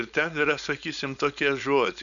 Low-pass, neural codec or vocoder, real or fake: 7.2 kHz; codec, 16 kHz, 4.8 kbps, FACodec; fake